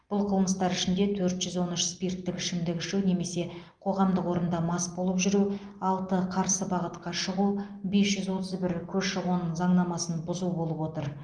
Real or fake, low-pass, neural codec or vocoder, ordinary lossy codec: real; 9.9 kHz; none; Opus, 24 kbps